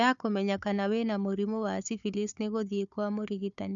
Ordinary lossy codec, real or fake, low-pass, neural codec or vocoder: none; fake; 7.2 kHz; codec, 16 kHz, 4 kbps, FunCodec, trained on Chinese and English, 50 frames a second